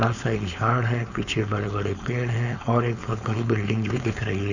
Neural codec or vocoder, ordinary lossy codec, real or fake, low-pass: codec, 16 kHz, 4.8 kbps, FACodec; none; fake; 7.2 kHz